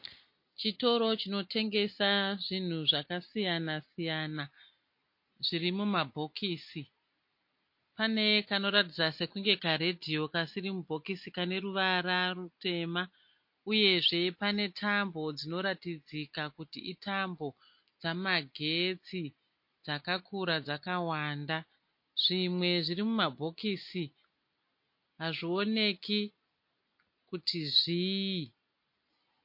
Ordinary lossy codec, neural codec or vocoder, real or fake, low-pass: MP3, 32 kbps; none; real; 5.4 kHz